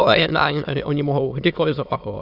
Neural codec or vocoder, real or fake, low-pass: autoencoder, 22.05 kHz, a latent of 192 numbers a frame, VITS, trained on many speakers; fake; 5.4 kHz